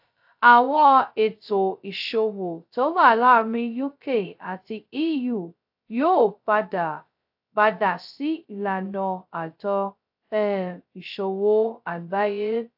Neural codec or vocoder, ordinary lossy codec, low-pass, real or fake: codec, 16 kHz, 0.2 kbps, FocalCodec; AAC, 48 kbps; 5.4 kHz; fake